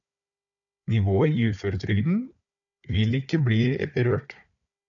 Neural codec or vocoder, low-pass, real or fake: codec, 16 kHz, 4 kbps, FunCodec, trained on Chinese and English, 50 frames a second; 7.2 kHz; fake